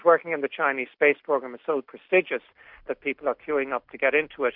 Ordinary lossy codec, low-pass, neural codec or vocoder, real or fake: MP3, 48 kbps; 5.4 kHz; none; real